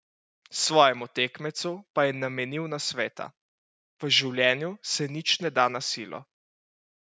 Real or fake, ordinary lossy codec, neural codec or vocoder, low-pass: real; none; none; none